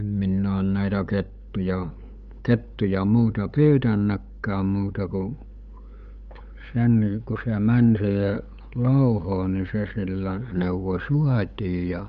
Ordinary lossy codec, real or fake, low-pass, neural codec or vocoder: none; fake; 7.2 kHz; codec, 16 kHz, 8 kbps, FunCodec, trained on LibriTTS, 25 frames a second